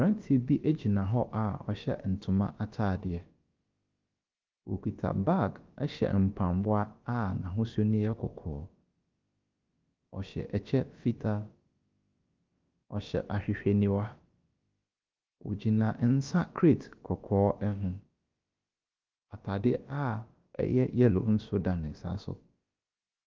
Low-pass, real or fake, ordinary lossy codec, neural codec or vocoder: 7.2 kHz; fake; Opus, 24 kbps; codec, 16 kHz, about 1 kbps, DyCAST, with the encoder's durations